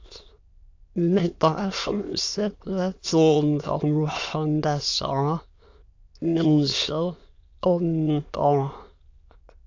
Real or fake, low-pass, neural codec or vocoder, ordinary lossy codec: fake; 7.2 kHz; autoencoder, 22.05 kHz, a latent of 192 numbers a frame, VITS, trained on many speakers; AAC, 48 kbps